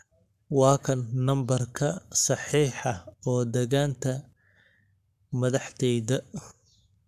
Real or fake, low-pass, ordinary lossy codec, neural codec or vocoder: fake; 14.4 kHz; none; codec, 44.1 kHz, 7.8 kbps, Pupu-Codec